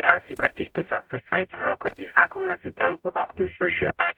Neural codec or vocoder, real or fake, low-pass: codec, 44.1 kHz, 0.9 kbps, DAC; fake; 19.8 kHz